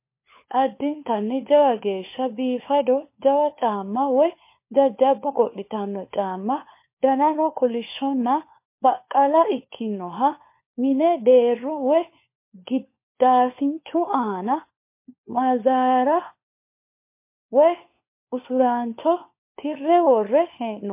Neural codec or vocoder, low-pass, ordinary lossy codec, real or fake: codec, 16 kHz, 4 kbps, FunCodec, trained on LibriTTS, 50 frames a second; 3.6 kHz; MP3, 24 kbps; fake